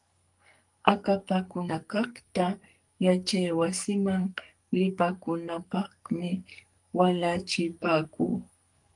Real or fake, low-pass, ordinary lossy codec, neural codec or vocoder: fake; 10.8 kHz; Opus, 32 kbps; codec, 44.1 kHz, 2.6 kbps, SNAC